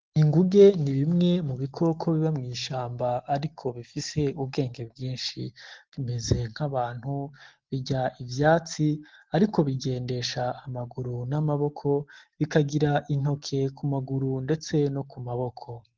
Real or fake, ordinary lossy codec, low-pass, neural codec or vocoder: real; Opus, 16 kbps; 7.2 kHz; none